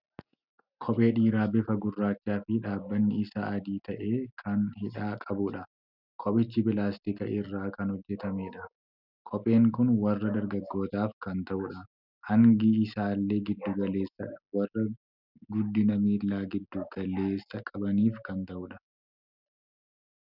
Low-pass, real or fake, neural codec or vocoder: 5.4 kHz; real; none